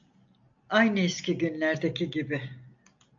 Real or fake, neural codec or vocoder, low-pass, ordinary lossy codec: real; none; 7.2 kHz; AAC, 64 kbps